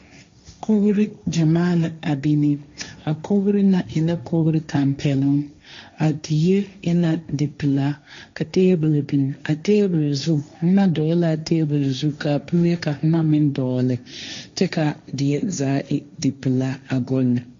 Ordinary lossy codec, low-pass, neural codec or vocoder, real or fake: MP3, 48 kbps; 7.2 kHz; codec, 16 kHz, 1.1 kbps, Voila-Tokenizer; fake